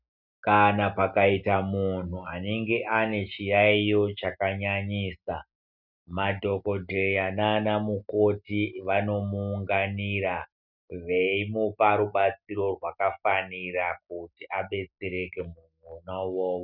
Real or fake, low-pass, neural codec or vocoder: real; 5.4 kHz; none